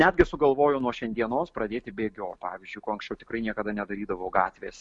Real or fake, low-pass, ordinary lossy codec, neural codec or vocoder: real; 10.8 kHz; MP3, 64 kbps; none